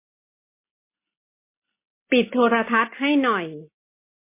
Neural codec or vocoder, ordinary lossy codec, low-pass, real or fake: none; MP3, 24 kbps; 3.6 kHz; real